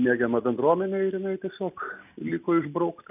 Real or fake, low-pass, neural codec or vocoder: real; 3.6 kHz; none